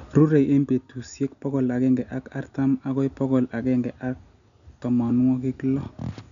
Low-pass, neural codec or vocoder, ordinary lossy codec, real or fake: 7.2 kHz; none; none; real